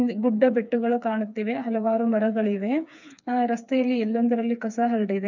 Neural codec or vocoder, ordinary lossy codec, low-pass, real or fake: codec, 16 kHz, 4 kbps, FreqCodec, smaller model; none; 7.2 kHz; fake